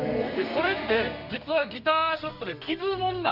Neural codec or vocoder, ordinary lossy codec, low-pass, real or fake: codec, 32 kHz, 1.9 kbps, SNAC; none; 5.4 kHz; fake